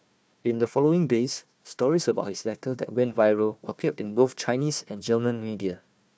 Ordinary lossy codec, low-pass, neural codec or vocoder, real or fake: none; none; codec, 16 kHz, 1 kbps, FunCodec, trained on Chinese and English, 50 frames a second; fake